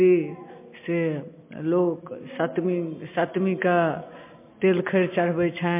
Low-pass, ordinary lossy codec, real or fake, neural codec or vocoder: 3.6 kHz; MP3, 24 kbps; real; none